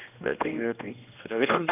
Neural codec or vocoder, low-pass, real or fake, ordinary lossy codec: codec, 16 kHz, 1 kbps, X-Codec, HuBERT features, trained on general audio; 3.6 kHz; fake; none